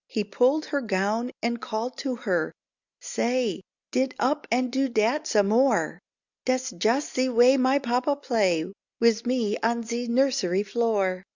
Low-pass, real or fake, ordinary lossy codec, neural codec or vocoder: 7.2 kHz; real; Opus, 64 kbps; none